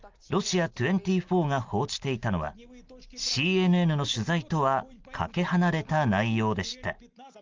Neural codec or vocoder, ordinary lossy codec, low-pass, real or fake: none; Opus, 32 kbps; 7.2 kHz; real